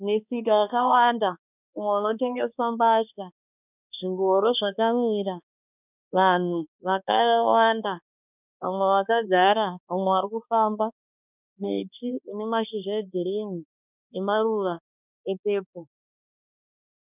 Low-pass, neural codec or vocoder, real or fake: 3.6 kHz; codec, 16 kHz, 2 kbps, X-Codec, HuBERT features, trained on balanced general audio; fake